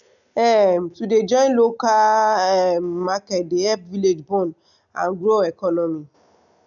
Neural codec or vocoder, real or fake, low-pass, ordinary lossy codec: none; real; 7.2 kHz; none